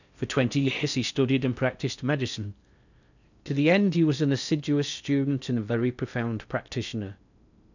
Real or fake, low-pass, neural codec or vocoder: fake; 7.2 kHz; codec, 16 kHz in and 24 kHz out, 0.6 kbps, FocalCodec, streaming, 4096 codes